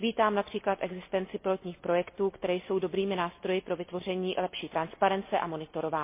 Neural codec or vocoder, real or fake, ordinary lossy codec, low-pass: none; real; MP3, 24 kbps; 3.6 kHz